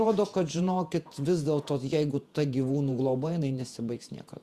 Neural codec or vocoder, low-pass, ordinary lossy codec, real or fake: vocoder, 48 kHz, 128 mel bands, Vocos; 14.4 kHz; Opus, 64 kbps; fake